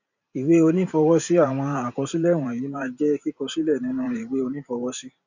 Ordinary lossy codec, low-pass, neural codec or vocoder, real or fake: none; 7.2 kHz; vocoder, 44.1 kHz, 128 mel bands every 512 samples, BigVGAN v2; fake